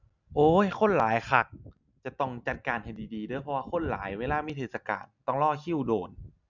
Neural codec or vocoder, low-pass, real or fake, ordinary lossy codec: none; 7.2 kHz; real; none